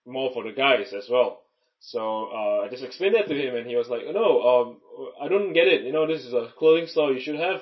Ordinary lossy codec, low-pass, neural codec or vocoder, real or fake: MP3, 24 kbps; 7.2 kHz; none; real